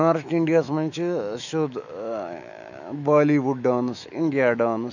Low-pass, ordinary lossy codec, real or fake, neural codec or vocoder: 7.2 kHz; none; fake; autoencoder, 48 kHz, 128 numbers a frame, DAC-VAE, trained on Japanese speech